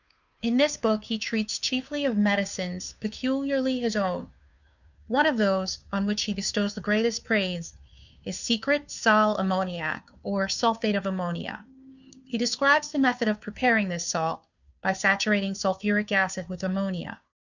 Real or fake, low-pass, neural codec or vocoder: fake; 7.2 kHz; codec, 16 kHz, 2 kbps, FunCodec, trained on Chinese and English, 25 frames a second